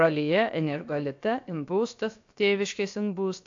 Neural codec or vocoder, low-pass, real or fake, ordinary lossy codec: codec, 16 kHz, about 1 kbps, DyCAST, with the encoder's durations; 7.2 kHz; fake; AAC, 64 kbps